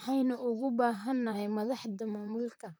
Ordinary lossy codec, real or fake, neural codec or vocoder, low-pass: none; fake; vocoder, 44.1 kHz, 128 mel bands, Pupu-Vocoder; none